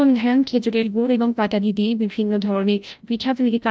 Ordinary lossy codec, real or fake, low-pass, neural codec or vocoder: none; fake; none; codec, 16 kHz, 0.5 kbps, FreqCodec, larger model